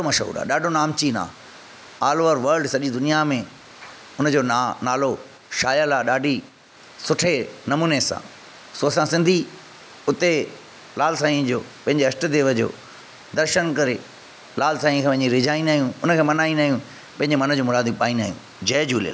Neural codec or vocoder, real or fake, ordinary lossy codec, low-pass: none; real; none; none